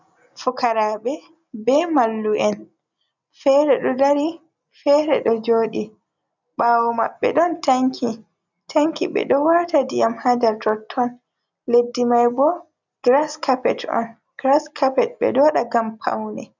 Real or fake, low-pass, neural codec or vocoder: real; 7.2 kHz; none